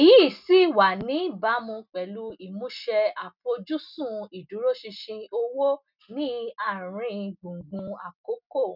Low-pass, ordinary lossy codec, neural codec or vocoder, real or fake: 5.4 kHz; none; none; real